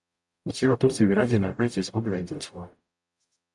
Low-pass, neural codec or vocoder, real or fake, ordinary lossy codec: 10.8 kHz; codec, 44.1 kHz, 0.9 kbps, DAC; fake; MP3, 96 kbps